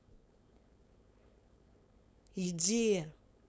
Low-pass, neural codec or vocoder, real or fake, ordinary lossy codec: none; codec, 16 kHz, 4.8 kbps, FACodec; fake; none